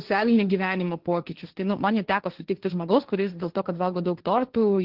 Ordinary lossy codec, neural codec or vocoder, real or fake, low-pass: Opus, 16 kbps; codec, 16 kHz, 1.1 kbps, Voila-Tokenizer; fake; 5.4 kHz